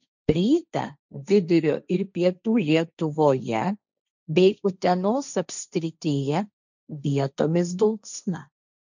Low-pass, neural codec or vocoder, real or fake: 7.2 kHz; codec, 16 kHz, 1.1 kbps, Voila-Tokenizer; fake